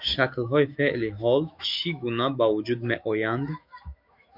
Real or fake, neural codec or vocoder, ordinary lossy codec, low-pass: fake; autoencoder, 48 kHz, 128 numbers a frame, DAC-VAE, trained on Japanese speech; MP3, 48 kbps; 5.4 kHz